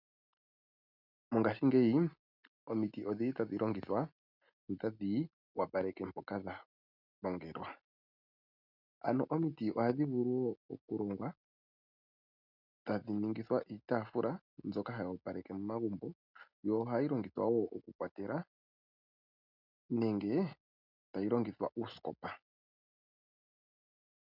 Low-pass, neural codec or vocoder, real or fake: 5.4 kHz; none; real